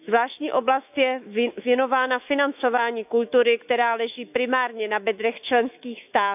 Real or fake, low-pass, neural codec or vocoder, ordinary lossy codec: fake; 3.6 kHz; autoencoder, 48 kHz, 128 numbers a frame, DAC-VAE, trained on Japanese speech; none